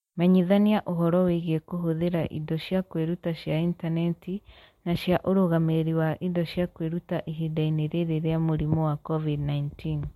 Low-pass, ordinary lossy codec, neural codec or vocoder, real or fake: 19.8 kHz; MP3, 64 kbps; none; real